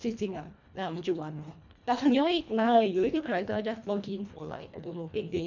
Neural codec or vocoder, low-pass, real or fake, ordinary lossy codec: codec, 24 kHz, 1.5 kbps, HILCodec; 7.2 kHz; fake; none